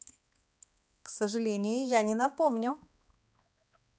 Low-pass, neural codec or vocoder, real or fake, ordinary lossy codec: none; codec, 16 kHz, 2 kbps, X-Codec, HuBERT features, trained on balanced general audio; fake; none